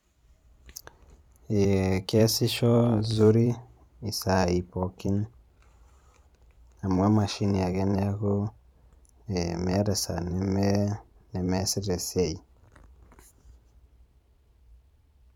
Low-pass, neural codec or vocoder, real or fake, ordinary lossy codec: 19.8 kHz; vocoder, 44.1 kHz, 128 mel bands every 512 samples, BigVGAN v2; fake; none